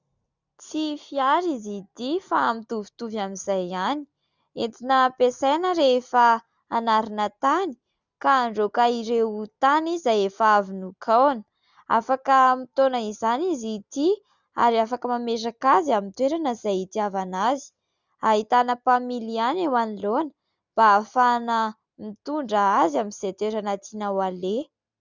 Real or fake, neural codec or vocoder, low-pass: real; none; 7.2 kHz